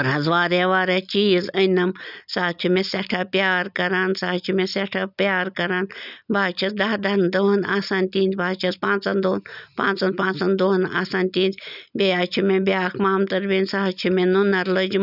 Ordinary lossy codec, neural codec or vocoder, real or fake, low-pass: none; none; real; 5.4 kHz